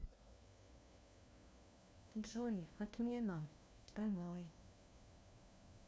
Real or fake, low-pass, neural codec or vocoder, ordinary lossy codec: fake; none; codec, 16 kHz, 1 kbps, FunCodec, trained on LibriTTS, 50 frames a second; none